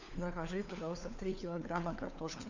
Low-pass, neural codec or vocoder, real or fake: 7.2 kHz; codec, 16 kHz, 4 kbps, FunCodec, trained on LibriTTS, 50 frames a second; fake